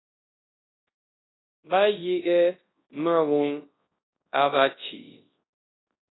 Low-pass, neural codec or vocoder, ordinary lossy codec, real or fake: 7.2 kHz; codec, 24 kHz, 0.9 kbps, WavTokenizer, large speech release; AAC, 16 kbps; fake